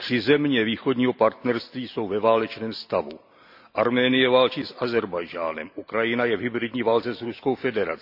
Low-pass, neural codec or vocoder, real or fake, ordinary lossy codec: 5.4 kHz; none; real; AAC, 48 kbps